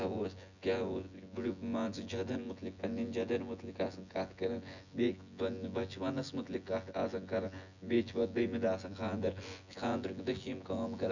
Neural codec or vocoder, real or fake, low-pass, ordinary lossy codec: vocoder, 24 kHz, 100 mel bands, Vocos; fake; 7.2 kHz; none